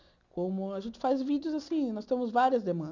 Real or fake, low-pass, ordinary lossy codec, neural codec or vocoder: real; 7.2 kHz; none; none